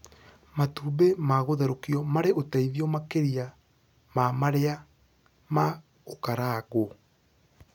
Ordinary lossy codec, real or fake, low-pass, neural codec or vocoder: none; real; 19.8 kHz; none